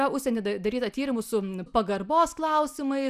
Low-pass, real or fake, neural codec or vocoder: 14.4 kHz; real; none